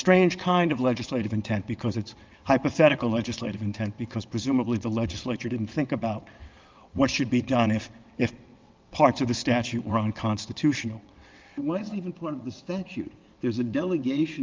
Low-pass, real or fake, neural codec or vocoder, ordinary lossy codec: 7.2 kHz; fake; vocoder, 22.05 kHz, 80 mel bands, WaveNeXt; Opus, 24 kbps